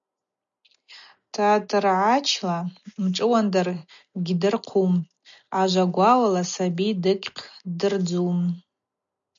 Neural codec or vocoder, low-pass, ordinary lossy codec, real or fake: none; 7.2 kHz; MP3, 64 kbps; real